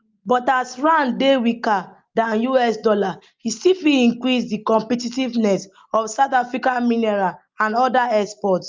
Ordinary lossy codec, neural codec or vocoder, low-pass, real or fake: Opus, 24 kbps; none; 7.2 kHz; real